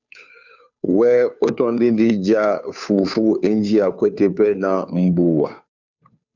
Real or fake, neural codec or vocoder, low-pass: fake; codec, 16 kHz, 2 kbps, FunCodec, trained on Chinese and English, 25 frames a second; 7.2 kHz